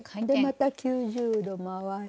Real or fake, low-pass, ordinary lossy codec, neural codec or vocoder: real; none; none; none